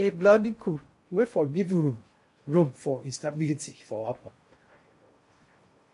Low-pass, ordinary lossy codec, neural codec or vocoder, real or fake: 10.8 kHz; MP3, 48 kbps; codec, 16 kHz in and 24 kHz out, 0.6 kbps, FocalCodec, streaming, 2048 codes; fake